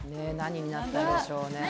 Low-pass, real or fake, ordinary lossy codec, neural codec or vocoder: none; real; none; none